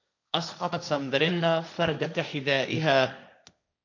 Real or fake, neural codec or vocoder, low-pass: fake; codec, 16 kHz, 1.1 kbps, Voila-Tokenizer; 7.2 kHz